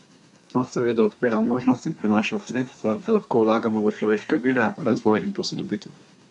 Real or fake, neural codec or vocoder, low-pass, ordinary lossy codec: fake; codec, 24 kHz, 1 kbps, SNAC; 10.8 kHz; MP3, 96 kbps